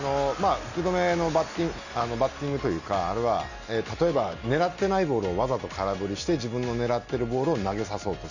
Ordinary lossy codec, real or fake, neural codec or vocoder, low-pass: none; real; none; 7.2 kHz